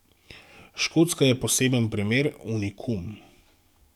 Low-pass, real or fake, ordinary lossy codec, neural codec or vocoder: 19.8 kHz; fake; none; codec, 44.1 kHz, 7.8 kbps, DAC